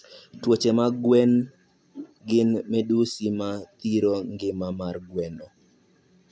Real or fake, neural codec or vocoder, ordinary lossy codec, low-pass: real; none; none; none